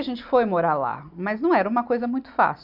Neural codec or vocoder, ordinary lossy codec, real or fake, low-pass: none; none; real; 5.4 kHz